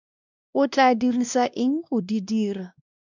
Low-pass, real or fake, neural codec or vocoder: 7.2 kHz; fake; codec, 16 kHz, 1 kbps, X-Codec, WavLM features, trained on Multilingual LibriSpeech